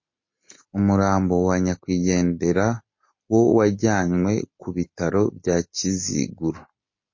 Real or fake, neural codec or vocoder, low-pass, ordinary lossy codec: real; none; 7.2 kHz; MP3, 32 kbps